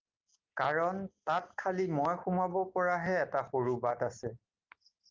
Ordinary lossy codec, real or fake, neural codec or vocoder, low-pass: Opus, 32 kbps; real; none; 7.2 kHz